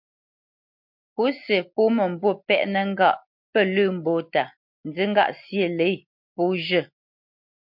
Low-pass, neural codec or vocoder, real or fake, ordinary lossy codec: 5.4 kHz; none; real; MP3, 48 kbps